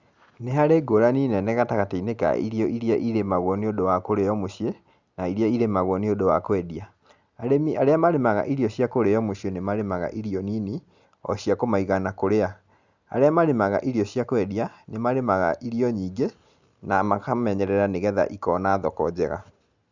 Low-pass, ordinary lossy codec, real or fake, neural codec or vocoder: 7.2 kHz; Opus, 64 kbps; real; none